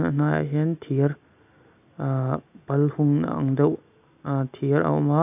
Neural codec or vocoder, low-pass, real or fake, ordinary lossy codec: none; 3.6 kHz; real; none